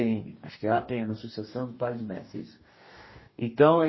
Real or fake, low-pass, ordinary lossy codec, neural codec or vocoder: fake; 7.2 kHz; MP3, 24 kbps; codec, 44.1 kHz, 2.6 kbps, DAC